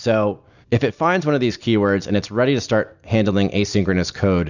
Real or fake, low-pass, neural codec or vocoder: real; 7.2 kHz; none